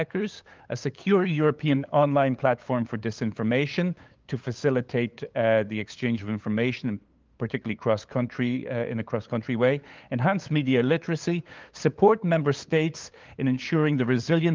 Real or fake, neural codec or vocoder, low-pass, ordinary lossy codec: fake; codec, 16 kHz, 8 kbps, FunCodec, trained on LibriTTS, 25 frames a second; 7.2 kHz; Opus, 24 kbps